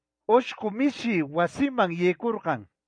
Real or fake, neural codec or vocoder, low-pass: real; none; 9.9 kHz